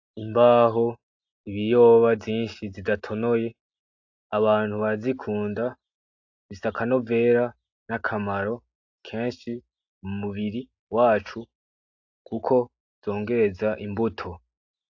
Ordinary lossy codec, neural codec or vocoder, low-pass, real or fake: AAC, 48 kbps; none; 7.2 kHz; real